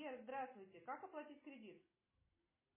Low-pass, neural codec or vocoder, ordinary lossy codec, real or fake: 3.6 kHz; none; MP3, 16 kbps; real